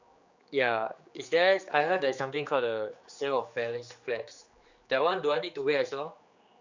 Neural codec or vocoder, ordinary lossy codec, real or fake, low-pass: codec, 16 kHz, 4 kbps, X-Codec, HuBERT features, trained on general audio; Opus, 64 kbps; fake; 7.2 kHz